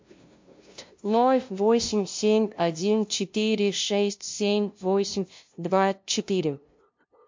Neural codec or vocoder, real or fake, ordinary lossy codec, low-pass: codec, 16 kHz, 0.5 kbps, FunCodec, trained on LibriTTS, 25 frames a second; fake; MP3, 48 kbps; 7.2 kHz